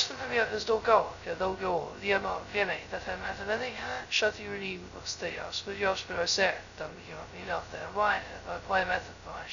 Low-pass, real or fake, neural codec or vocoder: 7.2 kHz; fake; codec, 16 kHz, 0.2 kbps, FocalCodec